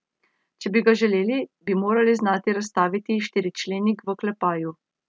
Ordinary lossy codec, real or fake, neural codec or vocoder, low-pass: none; real; none; none